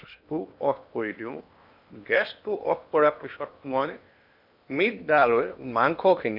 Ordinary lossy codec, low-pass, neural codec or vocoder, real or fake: none; 5.4 kHz; codec, 16 kHz in and 24 kHz out, 0.8 kbps, FocalCodec, streaming, 65536 codes; fake